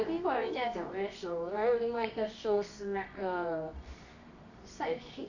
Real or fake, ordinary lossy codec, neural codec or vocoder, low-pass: fake; none; codec, 24 kHz, 0.9 kbps, WavTokenizer, medium music audio release; 7.2 kHz